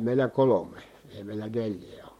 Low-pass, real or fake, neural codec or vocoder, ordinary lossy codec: 19.8 kHz; fake; vocoder, 44.1 kHz, 128 mel bands, Pupu-Vocoder; MP3, 64 kbps